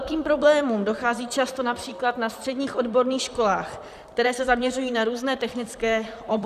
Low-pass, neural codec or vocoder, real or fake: 14.4 kHz; vocoder, 44.1 kHz, 128 mel bands, Pupu-Vocoder; fake